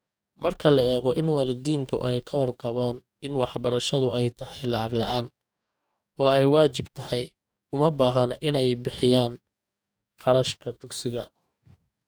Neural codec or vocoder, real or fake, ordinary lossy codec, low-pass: codec, 44.1 kHz, 2.6 kbps, DAC; fake; none; none